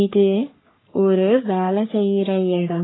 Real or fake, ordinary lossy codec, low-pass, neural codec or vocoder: fake; AAC, 16 kbps; 7.2 kHz; codec, 44.1 kHz, 3.4 kbps, Pupu-Codec